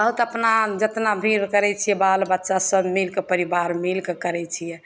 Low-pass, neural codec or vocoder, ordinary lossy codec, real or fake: none; none; none; real